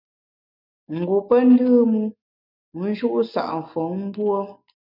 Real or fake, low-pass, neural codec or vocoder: real; 5.4 kHz; none